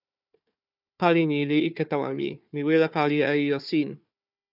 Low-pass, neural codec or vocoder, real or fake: 5.4 kHz; codec, 16 kHz, 4 kbps, FunCodec, trained on Chinese and English, 50 frames a second; fake